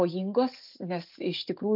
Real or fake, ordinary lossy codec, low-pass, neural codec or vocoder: real; MP3, 48 kbps; 5.4 kHz; none